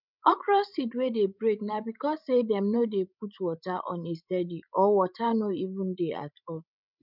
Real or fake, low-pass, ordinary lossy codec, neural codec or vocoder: fake; 5.4 kHz; none; codec, 16 kHz, 16 kbps, FreqCodec, larger model